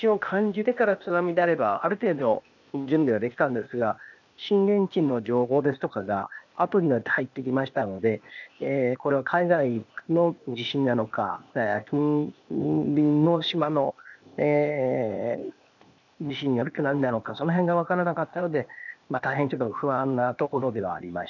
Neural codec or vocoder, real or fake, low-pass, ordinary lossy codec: codec, 16 kHz, 0.8 kbps, ZipCodec; fake; 7.2 kHz; none